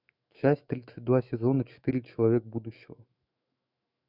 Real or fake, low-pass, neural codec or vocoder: fake; 5.4 kHz; autoencoder, 48 kHz, 128 numbers a frame, DAC-VAE, trained on Japanese speech